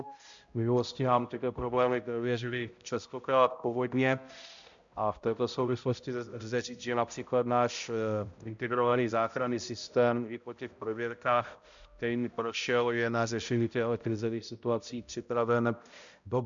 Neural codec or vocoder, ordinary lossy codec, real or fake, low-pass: codec, 16 kHz, 0.5 kbps, X-Codec, HuBERT features, trained on balanced general audio; MP3, 64 kbps; fake; 7.2 kHz